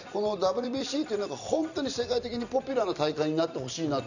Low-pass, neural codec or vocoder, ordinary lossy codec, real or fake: 7.2 kHz; vocoder, 44.1 kHz, 128 mel bands every 256 samples, BigVGAN v2; none; fake